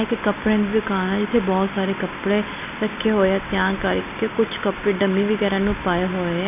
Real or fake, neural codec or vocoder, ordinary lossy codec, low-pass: real; none; none; 3.6 kHz